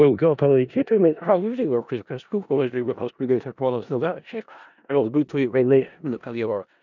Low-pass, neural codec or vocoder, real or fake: 7.2 kHz; codec, 16 kHz in and 24 kHz out, 0.4 kbps, LongCat-Audio-Codec, four codebook decoder; fake